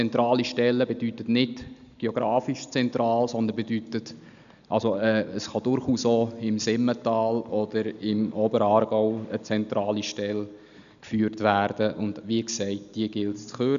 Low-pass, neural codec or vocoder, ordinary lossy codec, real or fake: 7.2 kHz; none; none; real